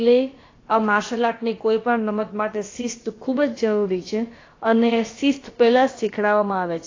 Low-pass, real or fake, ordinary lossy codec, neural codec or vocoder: 7.2 kHz; fake; AAC, 32 kbps; codec, 16 kHz, about 1 kbps, DyCAST, with the encoder's durations